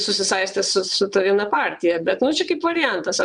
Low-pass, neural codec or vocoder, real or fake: 9.9 kHz; vocoder, 22.05 kHz, 80 mel bands, WaveNeXt; fake